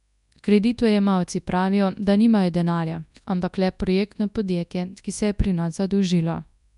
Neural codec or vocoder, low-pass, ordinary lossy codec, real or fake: codec, 24 kHz, 0.9 kbps, WavTokenizer, large speech release; 10.8 kHz; none; fake